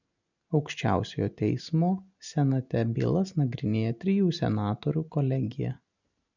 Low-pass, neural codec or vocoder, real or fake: 7.2 kHz; none; real